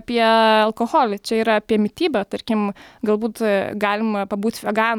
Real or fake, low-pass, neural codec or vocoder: real; 19.8 kHz; none